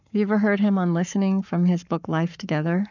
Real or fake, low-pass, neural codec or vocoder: fake; 7.2 kHz; codec, 44.1 kHz, 7.8 kbps, Pupu-Codec